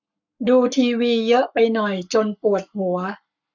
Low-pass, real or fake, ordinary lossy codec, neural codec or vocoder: 7.2 kHz; fake; none; codec, 44.1 kHz, 7.8 kbps, Pupu-Codec